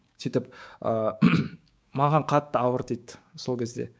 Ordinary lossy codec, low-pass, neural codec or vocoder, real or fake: none; none; codec, 16 kHz, 6 kbps, DAC; fake